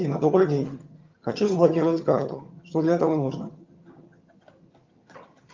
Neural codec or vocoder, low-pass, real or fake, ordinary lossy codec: vocoder, 22.05 kHz, 80 mel bands, HiFi-GAN; 7.2 kHz; fake; Opus, 32 kbps